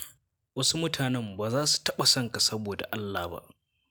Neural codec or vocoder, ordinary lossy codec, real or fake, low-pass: none; none; real; none